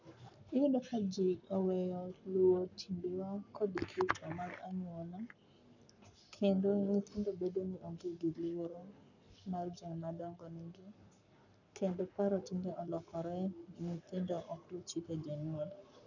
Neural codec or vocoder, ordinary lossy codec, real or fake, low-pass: codec, 44.1 kHz, 7.8 kbps, Pupu-Codec; none; fake; 7.2 kHz